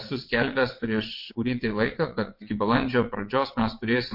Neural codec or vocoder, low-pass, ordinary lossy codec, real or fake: vocoder, 22.05 kHz, 80 mel bands, WaveNeXt; 5.4 kHz; MP3, 32 kbps; fake